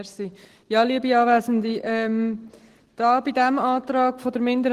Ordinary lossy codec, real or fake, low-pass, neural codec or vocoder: Opus, 16 kbps; real; 14.4 kHz; none